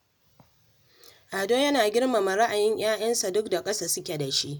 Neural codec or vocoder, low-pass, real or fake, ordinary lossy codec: none; none; real; none